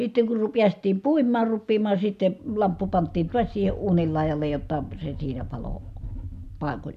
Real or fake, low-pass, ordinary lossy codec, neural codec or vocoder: real; 14.4 kHz; none; none